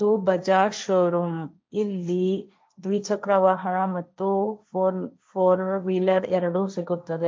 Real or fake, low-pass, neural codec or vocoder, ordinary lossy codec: fake; none; codec, 16 kHz, 1.1 kbps, Voila-Tokenizer; none